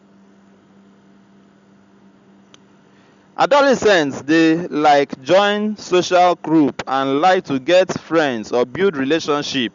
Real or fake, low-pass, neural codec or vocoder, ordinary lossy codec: real; 7.2 kHz; none; MP3, 64 kbps